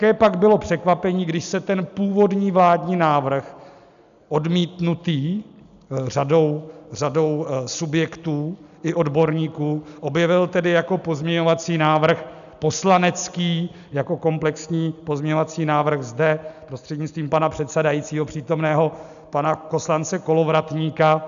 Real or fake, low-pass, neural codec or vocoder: real; 7.2 kHz; none